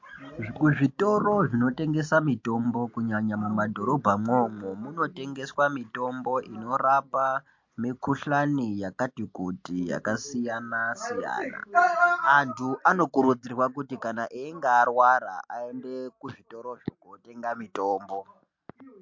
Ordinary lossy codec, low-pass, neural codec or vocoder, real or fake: MP3, 48 kbps; 7.2 kHz; none; real